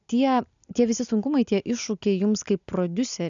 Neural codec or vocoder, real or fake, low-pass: none; real; 7.2 kHz